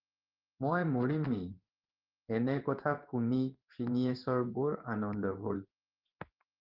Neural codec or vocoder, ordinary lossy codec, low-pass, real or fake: codec, 16 kHz in and 24 kHz out, 1 kbps, XY-Tokenizer; Opus, 32 kbps; 5.4 kHz; fake